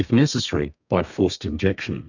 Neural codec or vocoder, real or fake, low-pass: codec, 32 kHz, 1.9 kbps, SNAC; fake; 7.2 kHz